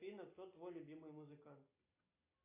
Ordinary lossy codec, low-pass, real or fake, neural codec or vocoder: AAC, 32 kbps; 3.6 kHz; real; none